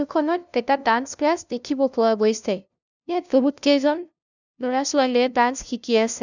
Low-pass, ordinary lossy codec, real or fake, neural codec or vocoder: 7.2 kHz; none; fake; codec, 16 kHz, 0.5 kbps, FunCodec, trained on LibriTTS, 25 frames a second